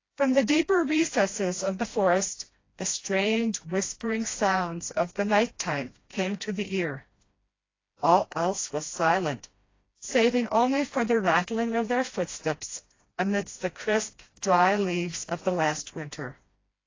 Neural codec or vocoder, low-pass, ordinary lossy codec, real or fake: codec, 16 kHz, 1 kbps, FreqCodec, smaller model; 7.2 kHz; AAC, 32 kbps; fake